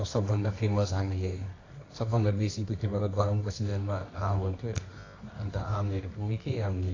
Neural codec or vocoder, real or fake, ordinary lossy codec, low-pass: codec, 24 kHz, 0.9 kbps, WavTokenizer, medium music audio release; fake; AAC, 32 kbps; 7.2 kHz